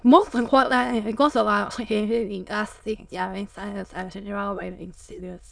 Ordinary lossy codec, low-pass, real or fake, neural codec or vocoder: none; 9.9 kHz; fake; autoencoder, 22.05 kHz, a latent of 192 numbers a frame, VITS, trained on many speakers